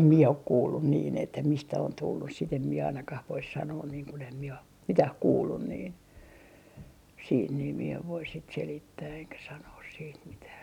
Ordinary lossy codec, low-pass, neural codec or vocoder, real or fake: none; 19.8 kHz; none; real